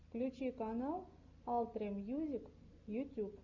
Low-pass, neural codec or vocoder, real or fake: 7.2 kHz; none; real